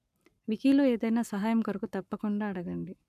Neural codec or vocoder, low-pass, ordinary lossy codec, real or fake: codec, 44.1 kHz, 7.8 kbps, Pupu-Codec; 14.4 kHz; none; fake